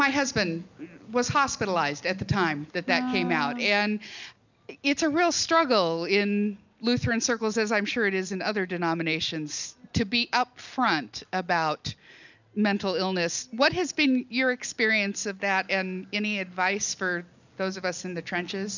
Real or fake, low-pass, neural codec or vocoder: real; 7.2 kHz; none